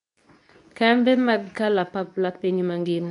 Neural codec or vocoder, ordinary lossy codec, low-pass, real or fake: codec, 24 kHz, 0.9 kbps, WavTokenizer, medium speech release version 2; none; 10.8 kHz; fake